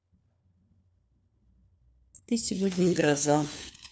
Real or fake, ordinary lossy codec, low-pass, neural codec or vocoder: fake; none; none; codec, 16 kHz, 4 kbps, FunCodec, trained on LibriTTS, 50 frames a second